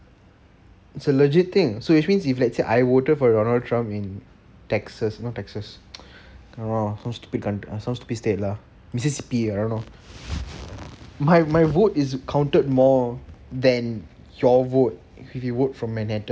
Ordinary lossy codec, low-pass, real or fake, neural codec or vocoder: none; none; real; none